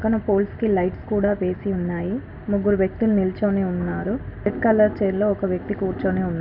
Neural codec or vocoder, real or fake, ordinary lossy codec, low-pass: none; real; none; 5.4 kHz